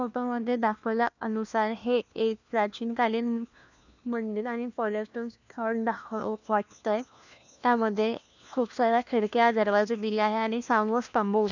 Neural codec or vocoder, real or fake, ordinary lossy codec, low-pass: codec, 16 kHz, 1 kbps, FunCodec, trained on LibriTTS, 50 frames a second; fake; none; 7.2 kHz